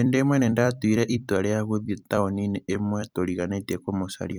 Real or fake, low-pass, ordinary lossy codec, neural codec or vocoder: fake; none; none; vocoder, 44.1 kHz, 128 mel bands every 256 samples, BigVGAN v2